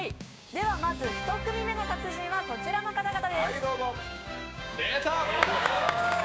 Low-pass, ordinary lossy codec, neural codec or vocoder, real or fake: none; none; codec, 16 kHz, 6 kbps, DAC; fake